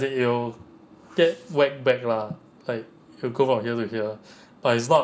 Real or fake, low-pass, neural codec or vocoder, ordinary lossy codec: real; none; none; none